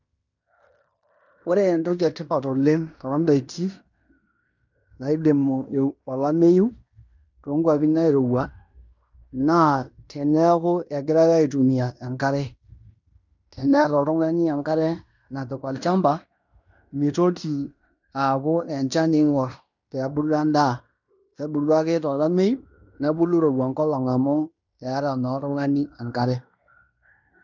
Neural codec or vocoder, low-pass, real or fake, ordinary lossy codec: codec, 16 kHz in and 24 kHz out, 0.9 kbps, LongCat-Audio-Codec, fine tuned four codebook decoder; 7.2 kHz; fake; none